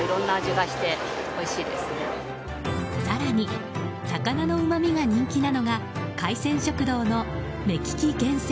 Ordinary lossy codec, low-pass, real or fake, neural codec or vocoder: none; none; real; none